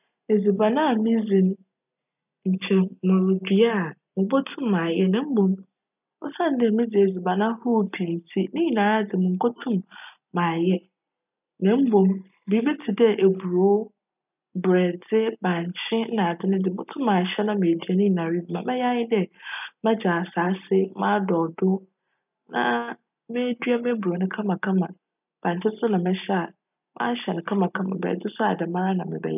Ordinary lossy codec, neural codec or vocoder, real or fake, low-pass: none; none; real; 3.6 kHz